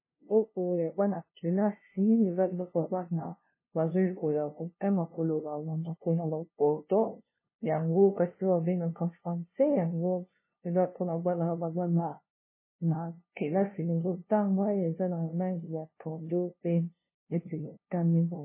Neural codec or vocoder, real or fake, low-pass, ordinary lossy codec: codec, 16 kHz, 0.5 kbps, FunCodec, trained on LibriTTS, 25 frames a second; fake; 3.6 kHz; MP3, 16 kbps